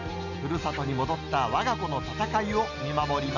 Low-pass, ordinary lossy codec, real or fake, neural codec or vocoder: 7.2 kHz; none; real; none